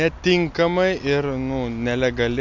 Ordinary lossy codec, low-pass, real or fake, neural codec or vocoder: MP3, 64 kbps; 7.2 kHz; real; none